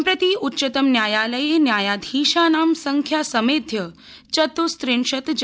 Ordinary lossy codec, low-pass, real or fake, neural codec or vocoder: none; none; real; none